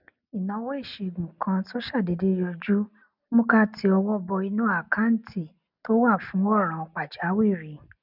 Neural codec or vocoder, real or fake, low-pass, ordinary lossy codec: none; real; 5.4 kHz; none